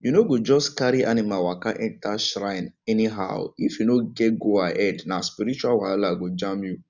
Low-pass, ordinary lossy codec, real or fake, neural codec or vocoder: 7.2 kHz; none; real; none